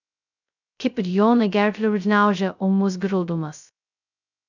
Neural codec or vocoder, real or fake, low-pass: codec, 16 kHz, 0.2 kbps, FocalCodec; fake; 7.2 kHz